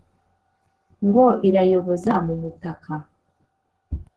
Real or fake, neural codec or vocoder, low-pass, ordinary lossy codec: fake; codec, 32 kHz, 1.9 kbps, SNAC; 10.8 kHz; Opus, 16 kbps